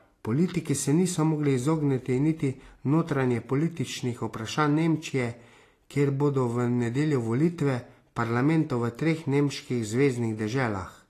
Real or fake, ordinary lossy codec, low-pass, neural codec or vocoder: real; AAC, 48 kbps; 14.4 kHz; none